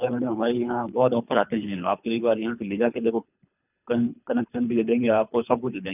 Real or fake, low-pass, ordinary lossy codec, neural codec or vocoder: fake; 3.6 kHz; none; codec, 24 kHz, 3 kbps, HILCodec